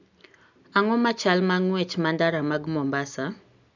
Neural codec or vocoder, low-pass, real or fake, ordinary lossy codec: none; 7.2 kHz; real; none